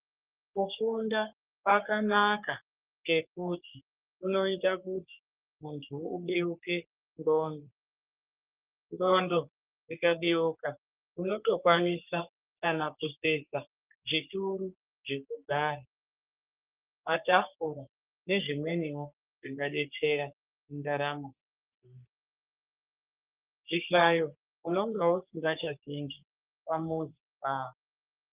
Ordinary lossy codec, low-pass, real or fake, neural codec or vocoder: Opus, 24 kbps; 3.6 kHz; fake; codec, 44.1 kHz, 3.4 kbps, Pupu-Codec